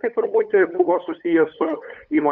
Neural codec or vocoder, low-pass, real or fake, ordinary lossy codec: codec, 16 kHz, 8 kbps, FunCodec, trained on LibriTTS, 25 frames a second; 7.2 kHz; fake; Opus, 64 kbps